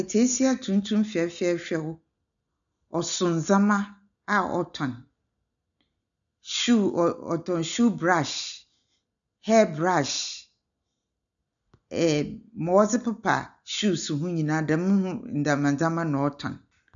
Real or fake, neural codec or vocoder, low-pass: real; none; 7.2 kHz